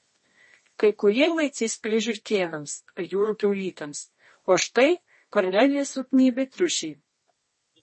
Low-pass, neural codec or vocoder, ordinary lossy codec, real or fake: 10.8 kHz; codec, 24 kHz, 0.9 kbps, WavTokenizer, medium music audio release; MP3, 32 kbps; fake